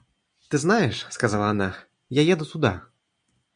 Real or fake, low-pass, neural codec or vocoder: real; 9.9 kHz; none